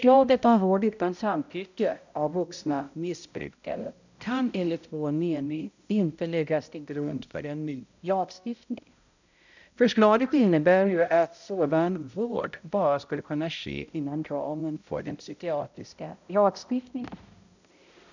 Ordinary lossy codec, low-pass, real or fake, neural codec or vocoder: none; 7.2 kHz; fake; codec, 16 kHz, 0.5 kbps, X-Codec, HuBERT features, trained on balanced general audio